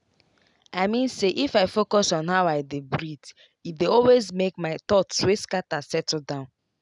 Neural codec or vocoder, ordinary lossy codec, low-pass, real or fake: none; none; 10.8 kHz; real